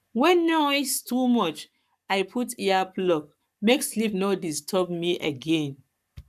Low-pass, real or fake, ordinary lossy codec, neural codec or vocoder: 14.4 kHz; fake; none; codec, 44.1 kHz, 7.8 kbps, Pupu-Codec